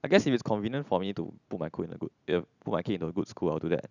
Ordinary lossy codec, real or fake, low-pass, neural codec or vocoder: none; real; 7.2 kHz; none